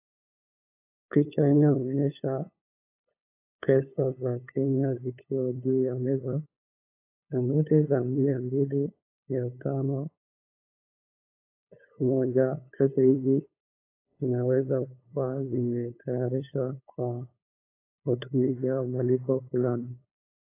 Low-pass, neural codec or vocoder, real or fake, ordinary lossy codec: 3.6 kHz; codec, 16 kHz, 8 kbps, FunCodec, trained on LibriTTS, 25 frames a second; fake; AAC, 24 kbps